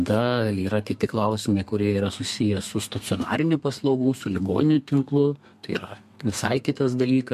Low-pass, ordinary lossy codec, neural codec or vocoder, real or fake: 14.4 kHz; MP3, 64 kbps; codec, 32 kHz, 1.9 kbps, SNAC; fake